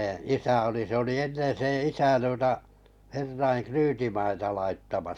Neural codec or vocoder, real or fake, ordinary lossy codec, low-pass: none; real; none; 19.8 kHz